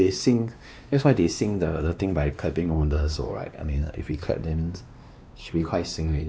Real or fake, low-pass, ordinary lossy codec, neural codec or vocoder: fake; none; none; codec, 16 kHz, 2 kbps, X-Codec, WavLM features, trained on Multilingual LibriSpeech